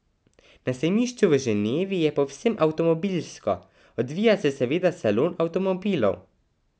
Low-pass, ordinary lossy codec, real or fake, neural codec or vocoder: none; none; real; none